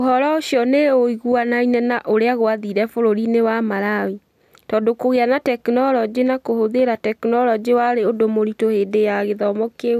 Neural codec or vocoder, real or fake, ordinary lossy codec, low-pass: none; real; none; 14.4 kHz